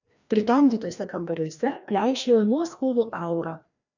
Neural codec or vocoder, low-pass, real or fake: codec, 16 kHz, 1 kbps, FreqCodec, larger model; 7.2 kHz; fake